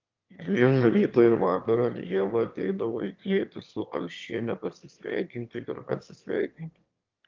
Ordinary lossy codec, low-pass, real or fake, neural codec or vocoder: Opus, 24 kbps; 7.2 kHz; fake; autoencoder, 22.05 kHz, a latent of 192 numbers a frame, VITS, trained on one speaker